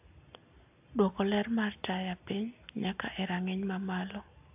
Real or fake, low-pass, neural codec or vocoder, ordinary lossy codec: real; 3.6 kHz; none; AAC, 32 kbps